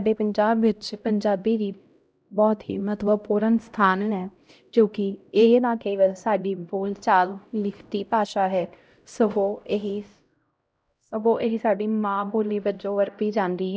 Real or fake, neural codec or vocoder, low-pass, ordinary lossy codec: fake; codec, 16 kHz, 0.5 kbps, X-Codec, HuBERT features, trained on LibriSpeech; none; none